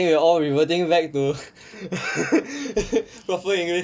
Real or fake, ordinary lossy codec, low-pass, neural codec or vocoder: real; none; none; none